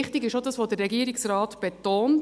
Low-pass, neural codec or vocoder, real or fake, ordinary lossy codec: none; none; real; none